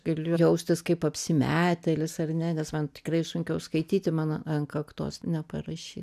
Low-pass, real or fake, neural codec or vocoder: 14.4 kHz; real; none